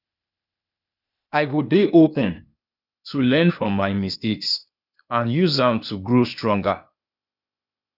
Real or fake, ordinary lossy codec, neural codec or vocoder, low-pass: fake; none; codec, 16 kHz, 0.8 kbps, ZipCodec; 5.4 kHz